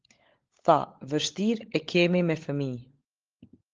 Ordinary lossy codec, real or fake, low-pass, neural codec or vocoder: Opus, 16 kbps; fake; 7.2 kHz; codec, 16 kHz, 16 kbps, FunCodec, trained on LibriTTS, 50 frames a second